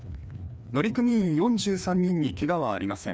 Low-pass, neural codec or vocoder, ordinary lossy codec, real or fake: none; codec, 16 kHz, 2 kbps, FreqCodec, larger model; none; fake